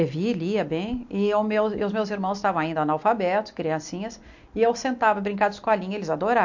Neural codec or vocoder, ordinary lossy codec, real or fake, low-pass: none; MP3, 48 kbps; real; 7.2 kHz